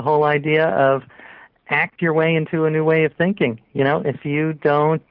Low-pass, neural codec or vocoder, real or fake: 5.4 kHz; none; real